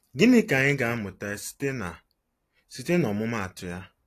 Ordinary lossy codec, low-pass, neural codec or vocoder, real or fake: AAC, 48 kbps; 14.4 kHz; none; real